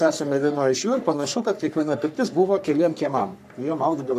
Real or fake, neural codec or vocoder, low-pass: fake; codec, 44.1 kHz, 3.4 kbps, Pupu-Codec; 14.4 kHz